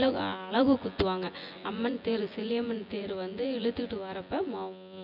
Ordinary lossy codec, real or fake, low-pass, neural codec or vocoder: none; fake; 5.4 kHz; vocoder, 24 kHz, 100 mel bands, Vocos